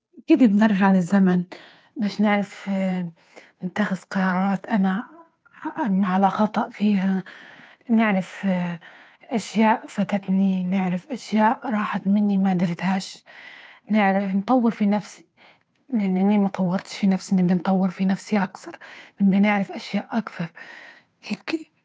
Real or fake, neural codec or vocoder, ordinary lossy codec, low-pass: fake; codec, 16 kHz, 2 kbps, FunCodec, trained on Chinese and English, 25 frames a second; none; none